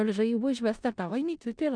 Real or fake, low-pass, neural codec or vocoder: fake; 9.9 kHz; codec, 16 kHz in and 24 kHz out, 0.4 kbps, LongCat-Audio-Codec, four codebook decoder